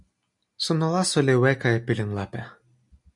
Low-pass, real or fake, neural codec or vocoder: 10.8 kHz; real; none